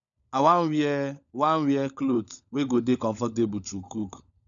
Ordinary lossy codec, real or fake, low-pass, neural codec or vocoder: none; fake; 7.2 kHz; codec, 16 kHz, 16 kbps, FunCodec, trained on LibriTTS, 50 frames a second